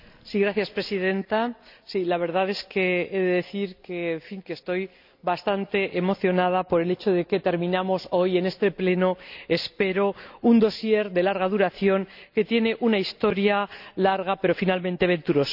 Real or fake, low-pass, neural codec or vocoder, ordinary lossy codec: real; 5.4 kHz; none; none